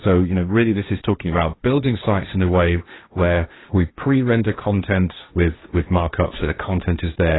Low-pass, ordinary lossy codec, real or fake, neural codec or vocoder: 7.2 kHz; AAC, 16 kbps; fake; codec, 16 kHz, 1.1 kbps, Voila-Tokenizer